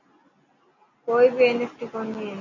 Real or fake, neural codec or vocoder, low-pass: real; none; 7.2 kHz